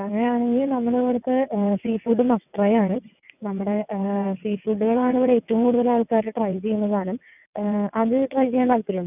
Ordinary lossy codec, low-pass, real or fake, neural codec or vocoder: none; 3.6 kHz; fake; vocoder, 22.05 kHz, 80 mel bands, WaveNeXt